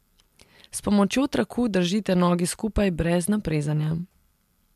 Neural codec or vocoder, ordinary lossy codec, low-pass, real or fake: none; MP3, 96 kbps; 14.4 kHz; real